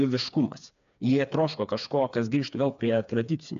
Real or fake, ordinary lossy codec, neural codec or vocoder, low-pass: fake; AAC, 96 kbps; codec, 16 kHz, 4 kbps, FreqCodec, smaller model; 7.2 kHz